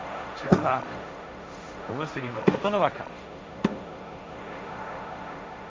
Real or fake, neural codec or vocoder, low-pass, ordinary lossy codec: fake; codec, 16 kHz, 1.1 kbps, Voila-Tokenizer; none; none